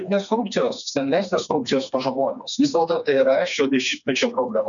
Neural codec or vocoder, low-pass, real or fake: codec, 16 kHz, 2 kbps, FreqCodec, smaller model; 7.2 kHz; fake